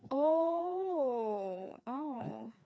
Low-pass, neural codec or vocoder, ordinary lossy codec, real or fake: none; codec, 16 kHz, 2 kbps, FreqCodec, larger model; none; fake